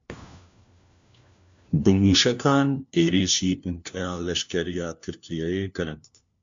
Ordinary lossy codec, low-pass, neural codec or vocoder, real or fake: MP3, 64 kbps; 7.2 kHz; codec, 16 kHz, 1 kbps, FunCodec, trained on LibriTTS, 50 frames a second; fake